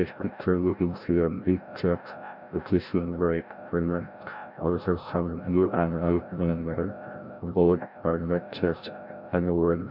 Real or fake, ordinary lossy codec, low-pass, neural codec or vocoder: fake; none; 5.4 kHz; codec, 16 kHz, 0.5 kbps, FreqCodec, larger model